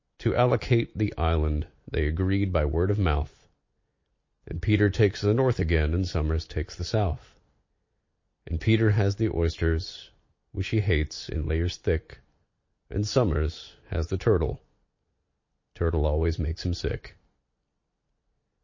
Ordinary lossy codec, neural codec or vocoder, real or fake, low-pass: MP3, 32 kbps; none; real; 7.2 kHz